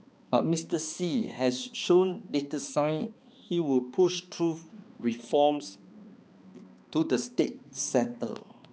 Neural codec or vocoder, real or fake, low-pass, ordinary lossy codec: codec, 16 kHz, 2 kbps, X-Codec, HuBERT features, trained on balanced general audio; fake; none; none